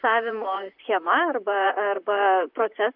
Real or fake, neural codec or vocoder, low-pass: fake; vocoder, 44.1 kHz, 128 mel bands, Pupu-Vocoder; 5.4 kHz